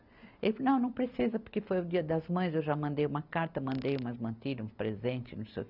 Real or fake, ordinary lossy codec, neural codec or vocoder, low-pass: real; none; none; 5.4 kHz